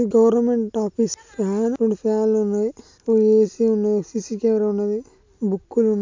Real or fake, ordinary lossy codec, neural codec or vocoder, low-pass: real; MP3, 64 kbps; none; 7.2 kHz